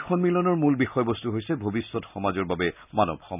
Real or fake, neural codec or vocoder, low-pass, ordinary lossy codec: real; none; 3.6 kHz; none